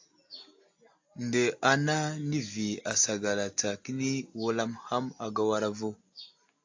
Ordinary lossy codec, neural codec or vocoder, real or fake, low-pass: AAC, 48 kbps; none; real; 7.2 kHz